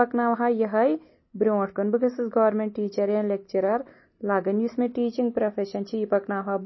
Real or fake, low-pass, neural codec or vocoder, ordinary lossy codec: real; 7.2 kHz; none; MP3, 24 kbps